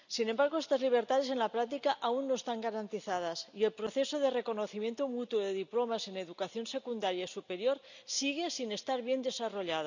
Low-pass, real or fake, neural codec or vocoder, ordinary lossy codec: 7.2 kHz; real; none; none